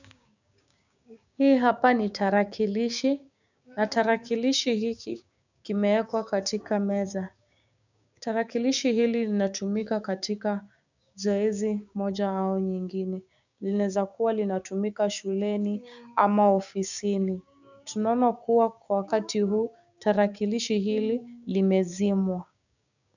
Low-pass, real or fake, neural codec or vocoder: 7.2 kHz; fake; codec, 16 kHz, 6 kbps, DAC